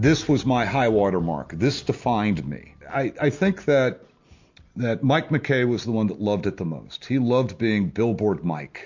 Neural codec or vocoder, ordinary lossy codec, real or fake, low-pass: none; MP3, 48 kbps; real; 7.2 kHz